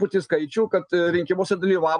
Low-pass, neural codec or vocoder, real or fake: 9.9 kHz; none; real